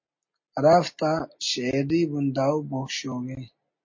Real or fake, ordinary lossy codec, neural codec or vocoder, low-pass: real; MP3, 32 kbps; none; 7.2 kHz